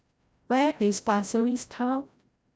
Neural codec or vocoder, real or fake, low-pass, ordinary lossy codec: codec, 16 kHz, 0.5 kbps, FreqCodec, larger model; fake; none; none